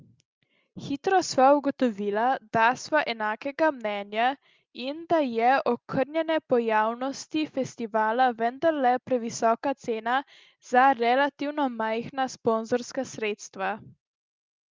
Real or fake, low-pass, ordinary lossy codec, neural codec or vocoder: real; 7.2 kHz; Opus, 32 kbps; none